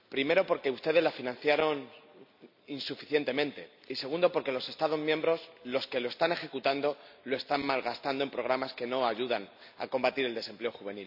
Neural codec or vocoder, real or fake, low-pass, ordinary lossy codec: none; real; 5.4 kHz; none